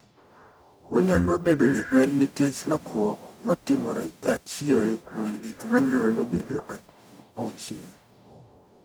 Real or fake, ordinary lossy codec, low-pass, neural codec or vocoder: fake; none; none; codec, 44.1 kHz, 0.9 kbps, DAC